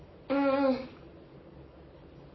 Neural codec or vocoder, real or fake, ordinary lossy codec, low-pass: codec, 44.1 kHz, 7.8 kbps, Pupu-Codec; fake; MP3, 24 kbps; 7.2 kHz